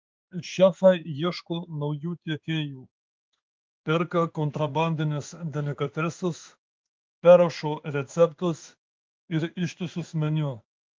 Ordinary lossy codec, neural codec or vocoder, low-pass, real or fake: Opus, 32 kbps; codec, 16 kHz in and 24 kHz out, 1 kbps, XY-Tokenizer; 7.2 kHz; fake